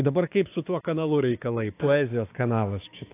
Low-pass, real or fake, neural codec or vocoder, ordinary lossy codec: 3.6 kHz; fake; codec, 16 kHz, 6 kbps, DAC; AAC, 24 kbps